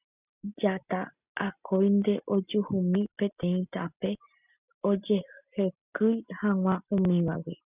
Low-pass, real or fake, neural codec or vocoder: 3.6 kHz; real; none